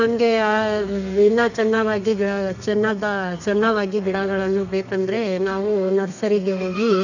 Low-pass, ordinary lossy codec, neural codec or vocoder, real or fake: 7.2 kHz; none; codec, 32 kHz, 1.9 kbps, SNAC; fake